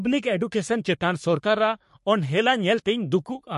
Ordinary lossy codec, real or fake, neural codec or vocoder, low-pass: MP3, 48 kbps; fake; codec, 44.1 kHz, 3.4 kbps, Pupu-Codec; 14.4 kHz